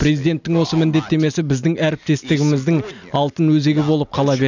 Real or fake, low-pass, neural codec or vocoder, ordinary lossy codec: real; 7.2 kHz; none; none